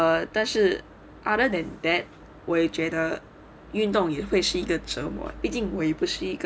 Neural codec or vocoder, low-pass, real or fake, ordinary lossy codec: none; none; real; none